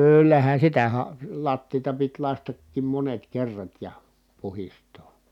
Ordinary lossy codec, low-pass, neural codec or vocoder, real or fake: none; 19.8 kHz; none; real